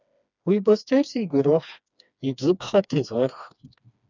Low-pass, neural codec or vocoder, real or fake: 7.2 kHz; codec, 16 kHz, 2 kbps, FreqCodec, smaller model; fake